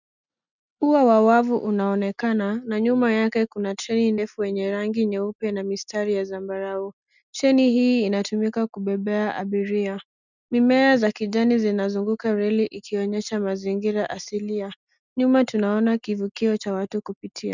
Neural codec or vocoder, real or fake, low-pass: none; real; 7.2 kHz